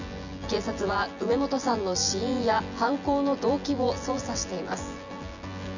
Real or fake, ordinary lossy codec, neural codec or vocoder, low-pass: fake; none; vocoder, 24 kHz, 100 mel bands, Vocos; 7.2 kHz